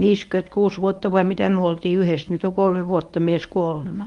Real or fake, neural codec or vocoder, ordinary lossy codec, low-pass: fake; codec, 24 kHz, 0.9 kbps, WavTokenizer, medium speech release version 1; Opus, 32 kbps; 10.8 kHz